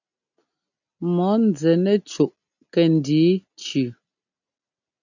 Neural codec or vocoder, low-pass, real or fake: none; 7.2 kHz; real